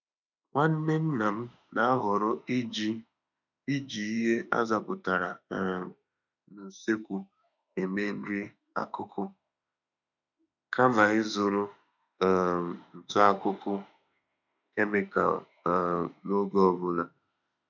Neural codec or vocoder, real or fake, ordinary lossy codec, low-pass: autoencoder, 48 kHz, 32 numbers a frame, DAC-VAE, trained on Japanese speech; fake; none; 7.2 kHz